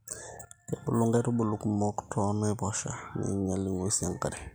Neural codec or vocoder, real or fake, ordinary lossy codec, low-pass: none; real; none; none